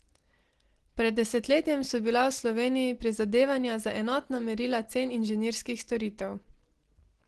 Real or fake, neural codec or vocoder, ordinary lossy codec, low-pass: real; none; Opus, 16 kbps; 10.8 kHz